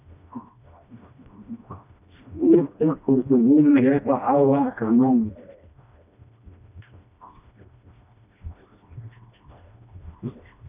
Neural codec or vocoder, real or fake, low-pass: codec, 16 kHz, 1 kbps, FreqCodec, smaller model; fake; 3.6 kHz